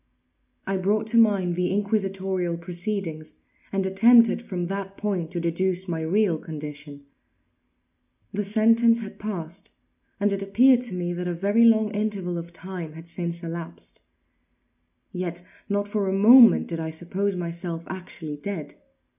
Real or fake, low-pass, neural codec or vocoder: real; 3.6 kHz; none